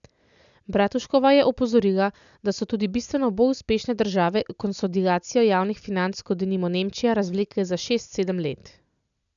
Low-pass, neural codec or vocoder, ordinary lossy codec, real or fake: 7.2 kHz; none; none; real